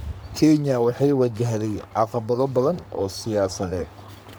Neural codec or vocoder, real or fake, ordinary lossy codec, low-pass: codec, 44.1 kHz, 3.4 kbps, Pupu-Codec; fake; none; none